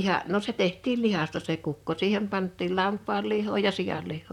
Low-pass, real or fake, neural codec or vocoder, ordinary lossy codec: 19.8 kHz; fake; vocoder, 44.1 kHz, 128 mel bands, Pupu-Vocoder; none